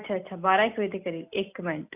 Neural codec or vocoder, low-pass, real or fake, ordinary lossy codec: none; 3.6 kHz; real; none